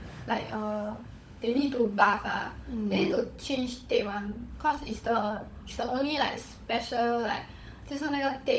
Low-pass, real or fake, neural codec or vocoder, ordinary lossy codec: none; fake; codec, 16 kHz, 16 kbps, FunCodec, trained on LibriTTS, 50 frames a second; none